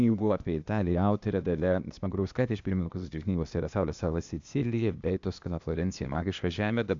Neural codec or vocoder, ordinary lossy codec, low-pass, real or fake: codec, 16 kHz, 0.8 kbps, ZipCodec; MP3, 64 kbps; 7.2 kHz; fake